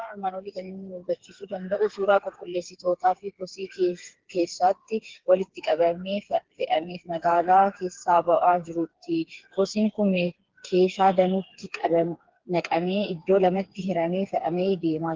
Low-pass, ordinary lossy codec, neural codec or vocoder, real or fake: 7.2 kHz; Opus, 16 kbps; codec, 16 kHz, 4 kbps, FreqCodec, smaller model; fake